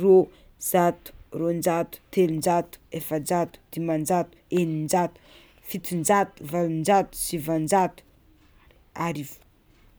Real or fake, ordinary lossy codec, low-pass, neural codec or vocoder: real; none; none; none